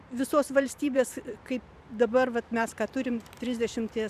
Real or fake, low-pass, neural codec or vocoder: fake; 14.4 kHz; vocoder, 44.1 kHz, 128 mel bands every 512 samples, BigVGAN v2